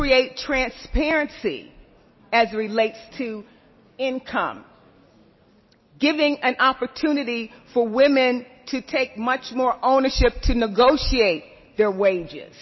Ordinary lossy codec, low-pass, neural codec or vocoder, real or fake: MP3, 24 kbps; 7.2 kHz; none; real